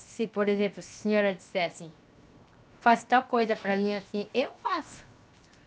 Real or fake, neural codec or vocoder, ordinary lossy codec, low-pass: fake; codec, 16 kHz, 0.7 kbps, FocalCodec; none; none